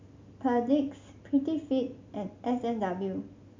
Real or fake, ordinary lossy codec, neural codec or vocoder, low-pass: real; MP3, 64 kbps; none; 7.2 kHz